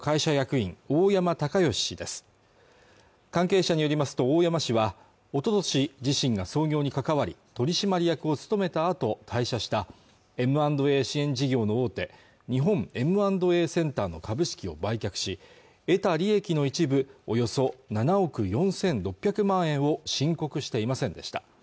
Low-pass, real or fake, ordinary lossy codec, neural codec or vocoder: none; real; none; none